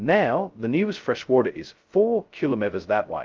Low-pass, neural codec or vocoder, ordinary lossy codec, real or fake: 7.2 kHz; codec, 16 kHz, 0.2 kbps, FocalCodec; Opus, 24 kbps; fake